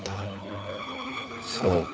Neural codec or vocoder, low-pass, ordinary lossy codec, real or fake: codec, 16 kHz, 4 kbps, FunCodec, trained on LibriTTS, 50 frames a second; none; none; fake